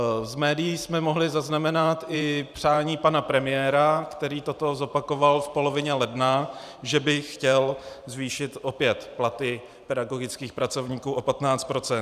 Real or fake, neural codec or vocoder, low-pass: fake; vocoder, 44.1 kHz, 128 mel bands every 512 samples, BigVGAN v2; 14.4 kHz